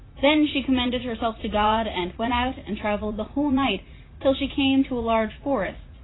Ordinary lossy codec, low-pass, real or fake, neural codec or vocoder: AAC, 16 kbps; 7.2 kHz; fake; vocoder, 44.1 kHz, 80 mel bands, Vocos